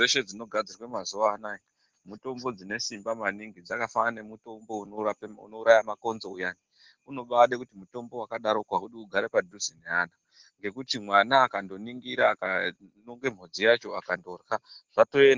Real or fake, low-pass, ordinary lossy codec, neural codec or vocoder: real; 7.2 kHz; Opus, 16 kbps; none